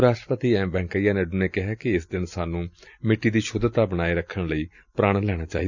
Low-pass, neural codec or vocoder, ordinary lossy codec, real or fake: 7.2 kHz; none; none; real